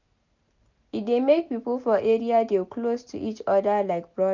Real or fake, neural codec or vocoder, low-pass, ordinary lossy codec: real; none; 7.2 kHz; none